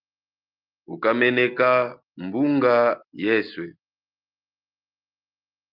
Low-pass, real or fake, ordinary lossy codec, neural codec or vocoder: 5.4 kHz; real; Opus, 32 kbps; none